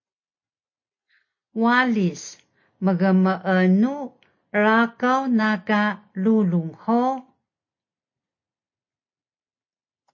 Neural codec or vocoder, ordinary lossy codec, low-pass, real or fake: none; MP3, 32 kbps; 7.2 kHz; real